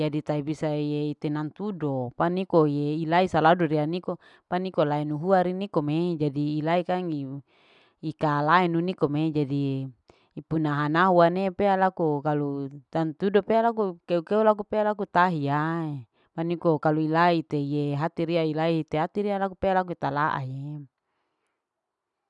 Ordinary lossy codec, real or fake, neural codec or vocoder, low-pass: none; real; none; 10.8 kHz